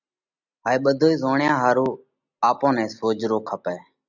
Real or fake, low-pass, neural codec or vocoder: real; 7.2 kHz; none